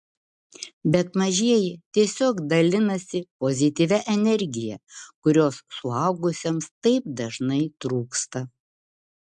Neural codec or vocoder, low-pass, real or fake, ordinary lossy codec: none; 10.8 kHz; real; MP3, 64 kbps